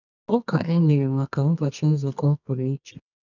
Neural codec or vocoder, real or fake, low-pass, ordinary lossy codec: codec, 24 kHz, 0.9 kbps, WavTokenizer, medium music audio release; fake; 7.2 kHz; none